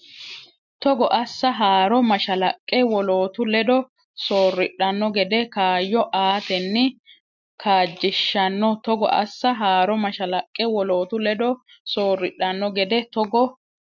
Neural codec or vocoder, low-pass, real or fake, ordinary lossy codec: none; 7.2 kHz; real; MP3, 64 kbps